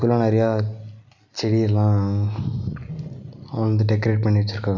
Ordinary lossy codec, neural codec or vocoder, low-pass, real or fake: none; none; 7.2 kHz; real